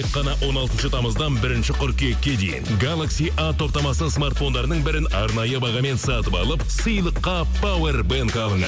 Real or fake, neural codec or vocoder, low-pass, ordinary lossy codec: real; none; none; none